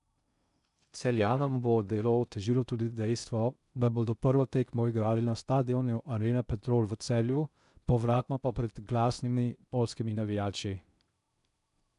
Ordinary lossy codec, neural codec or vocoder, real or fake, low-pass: none; codec, 16 kHz in and 24 kHz out, 0.6 kbps, FocalCodec, streaming, 2048 codes; fake; 10.8 kHz